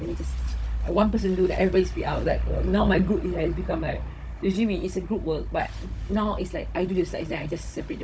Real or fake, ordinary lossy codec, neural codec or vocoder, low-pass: fake; none; codec, 16 kHz, 16 kbps, FunCodec, trained on Chinese and English, 50 frames a second; none